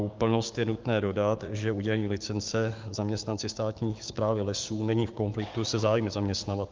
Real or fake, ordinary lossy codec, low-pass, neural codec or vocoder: fake; Opus, 32 kbps; 7.2 kHz; codec, 16 kHz, 6 kbps, DAC